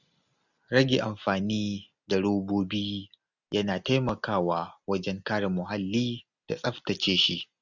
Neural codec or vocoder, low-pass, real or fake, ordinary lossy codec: none; 7.2 kHz; real; none